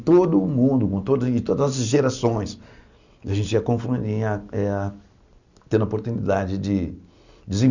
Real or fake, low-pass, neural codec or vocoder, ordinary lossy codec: real; 7.2 kHz; none; none